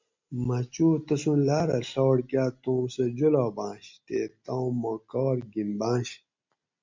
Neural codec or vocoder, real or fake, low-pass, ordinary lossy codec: none; real; 7.2 kHz; MP3, 64 kbps